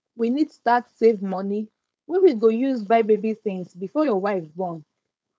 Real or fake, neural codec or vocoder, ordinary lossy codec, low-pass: fake; codec, 16 kHz, 4.8 kbps, FACodec; none; none